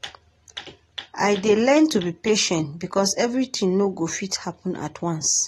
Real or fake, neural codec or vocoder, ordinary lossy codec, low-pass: real; none; AAC, 32 kbps; 19.8 kHz